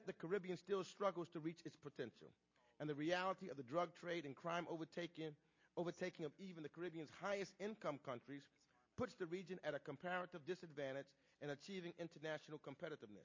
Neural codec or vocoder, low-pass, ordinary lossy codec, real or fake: none; 7.2 kHz; MP3, 32 kbps; real